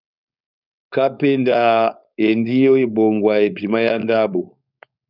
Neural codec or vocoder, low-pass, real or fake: codec, 16 kHz, 4.8 kbps, FACodec; 5.4 kHz; fake